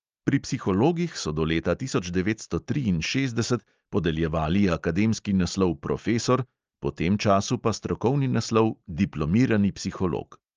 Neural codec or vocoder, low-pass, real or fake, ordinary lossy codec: none; 7.2 kHz; real; Opus, 24 kbps